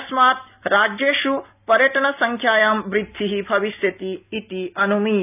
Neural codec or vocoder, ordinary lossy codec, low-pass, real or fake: none; none; 3.6 kHz; real